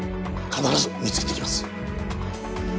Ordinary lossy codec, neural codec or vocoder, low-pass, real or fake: none; none; none; real